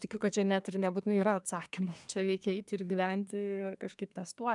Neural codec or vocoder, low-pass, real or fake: codec, 24 kHz, 1 kbps, SNAC; 10.8 kHz; fake